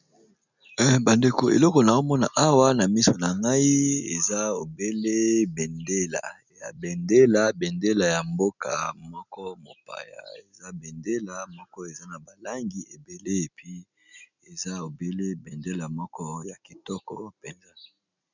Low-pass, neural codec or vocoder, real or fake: 7.2 kHz; none; real